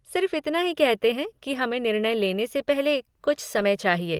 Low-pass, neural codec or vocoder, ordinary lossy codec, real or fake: 19.8 kHz; none; Opus, 24 kbps; real